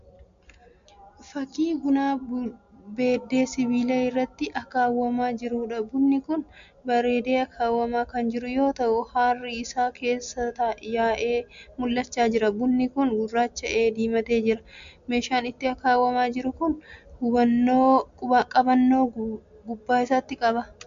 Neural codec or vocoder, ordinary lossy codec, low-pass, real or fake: none; MP3, 64 kbps; 7.2 kHz; real